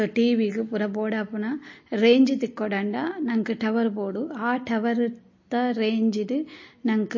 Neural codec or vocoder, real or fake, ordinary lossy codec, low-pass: none; real; MP3, 32 kbps; 7.2 kHz